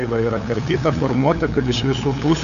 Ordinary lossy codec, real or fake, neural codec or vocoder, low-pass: AAC, 96 kbps; fake; codec, 16 kHz, 8 kbps, FunCodec, trained on LibriTTS, 25 frames a second; 7.2 kHz